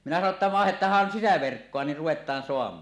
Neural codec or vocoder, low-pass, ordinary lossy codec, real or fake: none; none; none; real